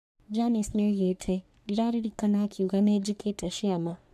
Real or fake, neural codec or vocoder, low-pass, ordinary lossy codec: fake; codec, 44.1 kHz, 3.4 kbps, Pupu-Codec; 14.4 kHz; none